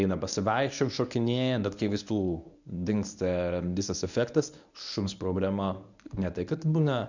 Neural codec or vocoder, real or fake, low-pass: codec, 24 kHz, 0.9 kbps, WavTokenizer, medium speech release version 1; fake; 7.2 kHz